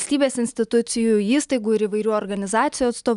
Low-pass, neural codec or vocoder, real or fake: 10.8 kHz; none; real